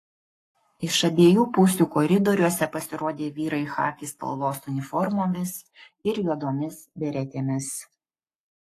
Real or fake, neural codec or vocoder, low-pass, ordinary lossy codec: fake; codec, 44.1 kHz, 7.8 kbps, Pupu-Codec; 14.4 kHz; AAC, 48 kbps